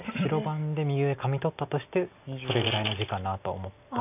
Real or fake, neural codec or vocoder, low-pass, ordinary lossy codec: real; none; 3.6 kHz; none